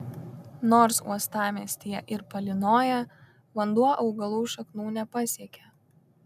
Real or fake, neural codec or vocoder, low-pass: real; none; 14.4 kHz